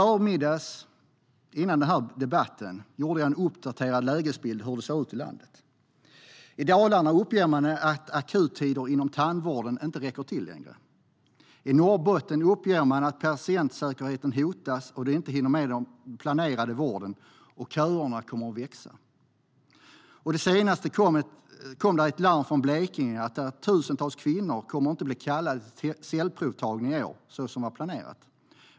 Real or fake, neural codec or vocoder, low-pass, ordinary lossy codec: real; none; none; none